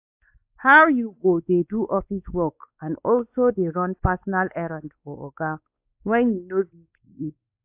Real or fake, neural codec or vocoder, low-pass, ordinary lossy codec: fake; codec, 16 kHz, 4 kbps, X-Codec, WavLM features, trained on Multilingual LibriSpeech; 3.6 kHz; AAC, 32 kbps